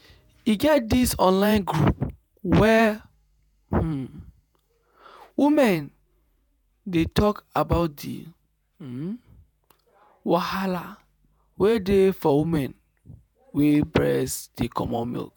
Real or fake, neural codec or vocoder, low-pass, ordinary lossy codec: fake; vocoder, 48 kHz, 128 mel bands, Vocos; none; none